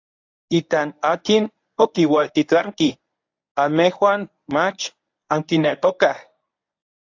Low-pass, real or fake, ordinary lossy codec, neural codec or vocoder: 7.2 kHz; fake; AAC, 48 kbps; codec, 24 kHz, 0.9 kbps, WavTokenizer, medium speech release version 1